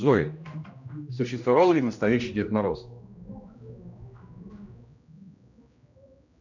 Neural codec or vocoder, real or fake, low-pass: codec, 16 kHz, 1 kbps, X-Codec, HuBERT features, trained on general audio; fake; 7.2 kHz